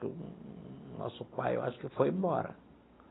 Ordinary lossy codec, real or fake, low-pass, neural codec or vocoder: AAC, 16 kbps; real; 7.2 kHz; none